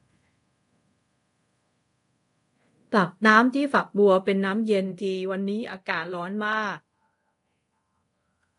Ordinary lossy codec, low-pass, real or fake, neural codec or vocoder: AAC, 48 kbps; 10.8 kHz; fake; codec, 24 kHz, 0.5 kbps, DualCodec